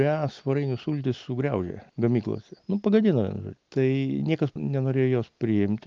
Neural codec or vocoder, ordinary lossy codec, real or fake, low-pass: none; Opus, 24 kbps; real; 7.2 kHz